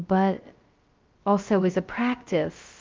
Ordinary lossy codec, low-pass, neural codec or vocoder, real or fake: Opus, 32 kbps; 7.2 kHz; codec, 16 kHz, 0.2 kbps, FocalCodec; fake